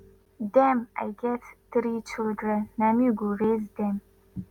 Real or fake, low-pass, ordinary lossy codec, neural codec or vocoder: real; 19.8 kHz; Opus, 64 kbps; none